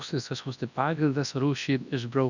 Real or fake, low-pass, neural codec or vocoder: fake; 7.2 kHz; codec, 24 kHz, 0.9 kbps, WavTokenizer, large speech release